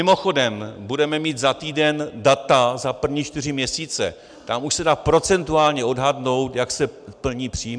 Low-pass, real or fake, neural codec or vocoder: 9.9 kHz; real; none